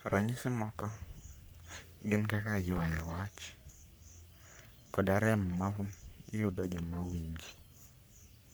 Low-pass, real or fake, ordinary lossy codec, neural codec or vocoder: none; fake; none; codec, 44.1 kHz, 3.4 kbps, Pupu-Codec